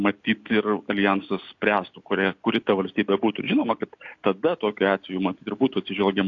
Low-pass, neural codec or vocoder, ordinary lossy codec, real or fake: 7.2 kHz; none; AAC, 48 kbps; real